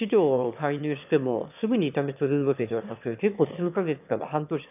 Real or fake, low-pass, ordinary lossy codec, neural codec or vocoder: fake; 3.6 kHz; none; autoencoder, 22.05 kHz, a latent of 192 numbers a frame, VITS, trained on one speaker